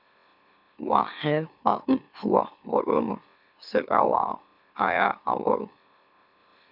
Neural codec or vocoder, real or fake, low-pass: autoencoder, 44.1 kHz, a latent of 192 numbers a frame, MeloTTS; fake; 5.4 kHz